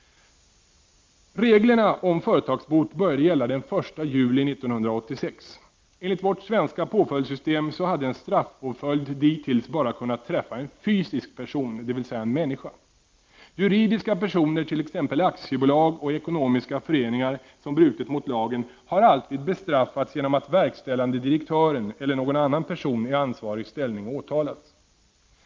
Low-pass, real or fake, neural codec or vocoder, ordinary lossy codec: 7.2 kHz; real; none; Opus, 32 kbps